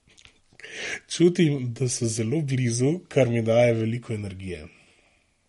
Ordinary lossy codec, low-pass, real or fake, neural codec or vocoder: MP3, 48 kbps; 19.8 kHz; real; none